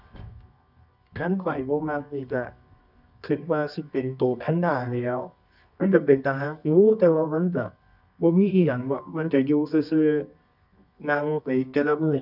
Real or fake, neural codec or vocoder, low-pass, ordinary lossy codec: fake; codec, 24 kHz, 0.9 kbps, WavTokenizer, medium music audio release; 5.4 kHz; none